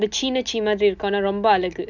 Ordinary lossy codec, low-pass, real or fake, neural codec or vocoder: none; 7.2 kHz; real; none